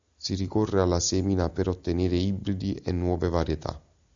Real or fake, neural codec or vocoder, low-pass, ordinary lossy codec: real; none; 7.2 kHz; MP3, 64 kbps